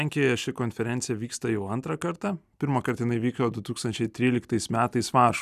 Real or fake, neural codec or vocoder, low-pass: real; none; 14.4 kHz